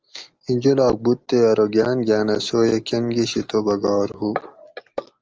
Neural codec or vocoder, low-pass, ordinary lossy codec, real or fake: none; 7.2 kHz; Opus, 24 kbps; real